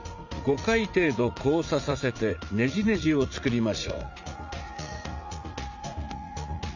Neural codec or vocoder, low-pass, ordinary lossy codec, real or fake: vocoder, 44.1 kHz, 80 mel bands, Vocos; 7.2 kHz; none; fake